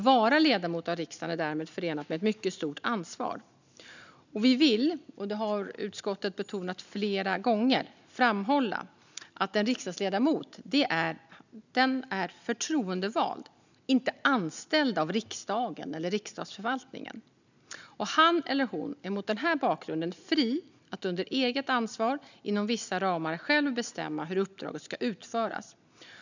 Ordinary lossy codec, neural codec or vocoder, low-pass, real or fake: none; none; 7.2 kHz; real